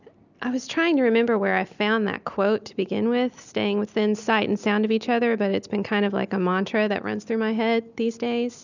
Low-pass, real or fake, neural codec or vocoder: 7.2 kHz; real; none